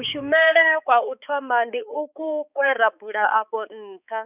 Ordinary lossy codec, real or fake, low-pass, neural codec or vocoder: none; fake; 3.6 kHz; codec, 16 kHz, 4 kbps, X-Codec, HuBERT features, trained on balanced general audio